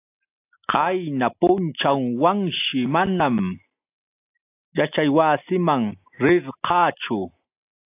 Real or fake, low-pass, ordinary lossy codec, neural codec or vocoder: real; 3.6 kHz; AAC, 32 kbps; none